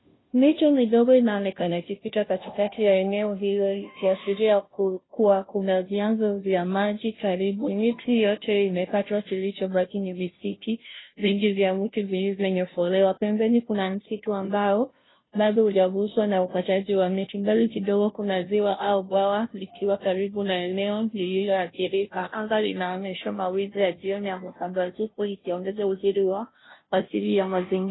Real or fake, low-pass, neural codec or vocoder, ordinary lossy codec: fake; 7.2 kHz; codec, 16 kHz, 0.5 kbps, FunCodec, trained on Chinese and English, 25 frames a second; AAC, 16 kbps